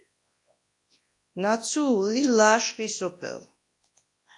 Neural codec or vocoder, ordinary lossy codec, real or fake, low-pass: codec, 24 kHz, 0.9 kbps, WavTokenizer, large speech release; AAC, 48 kbps; fake; 10.8 kHz